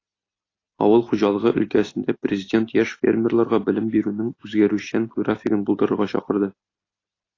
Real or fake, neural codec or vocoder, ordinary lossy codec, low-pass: real; none; AAC, 32 kbps; 7.2 kHz